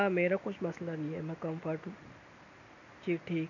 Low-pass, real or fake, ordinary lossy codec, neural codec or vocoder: 7.2 kHz; real; none; none